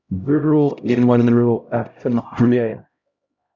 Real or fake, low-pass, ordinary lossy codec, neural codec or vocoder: fake; 7.2 kHz; Opus, 64 kbps; codec, 16 kHz, 0.5 kbps, X-Codec, HuBERT features, trained on LibriSpeech